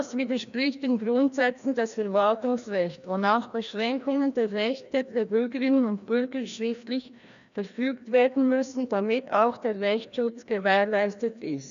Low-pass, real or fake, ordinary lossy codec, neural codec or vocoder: 7.2 kHz; fake; none; codec, 16 kHz, 1 kbps, FreqCodec, larger model